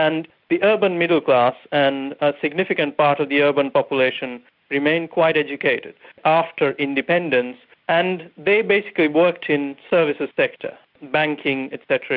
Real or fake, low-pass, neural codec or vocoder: real; 5.4 kHz; none